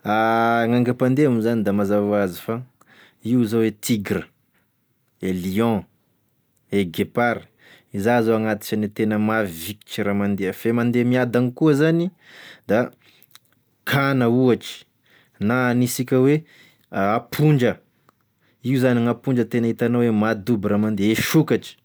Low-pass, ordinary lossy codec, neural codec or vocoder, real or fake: none; none; none; real